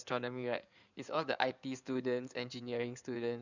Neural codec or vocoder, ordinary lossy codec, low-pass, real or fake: codec, 16 kHz in and 24 kHz out, 2.2 kbps, FireRedTTS-2 codec; none; 7.2 kHz; fake